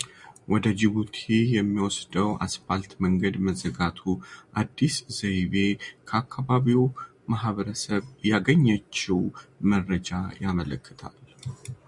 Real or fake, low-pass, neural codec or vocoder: real; 10.8 kHz; none